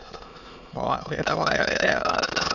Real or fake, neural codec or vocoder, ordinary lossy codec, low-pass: fake; autoencoder, 22.05 kHz, a latent of 192 numbers a frame, VITS, trained on many speakers; none; 7.2 kHz